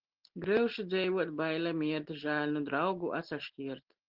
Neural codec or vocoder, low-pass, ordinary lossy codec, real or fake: none; 5.4 kHz; Opus, 16 kbps; real